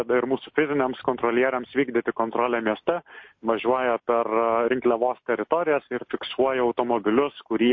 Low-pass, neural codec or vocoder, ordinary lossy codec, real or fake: 7.2 kHz; none; MP3, 32 kbps; real